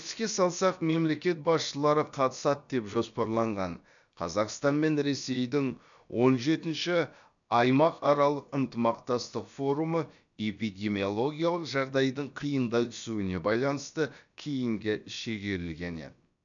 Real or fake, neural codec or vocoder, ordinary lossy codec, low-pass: fake; codec, 16 kHz, about 1 kbps, DyCAST, with the encoder's durations; none; 7.2 kHz